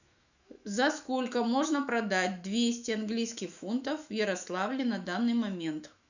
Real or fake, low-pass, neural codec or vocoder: fake; 7.2 kHz; autoencoder, 48 kHz, 128 numbers a frame, DAC-VAE, trained on Japanese speech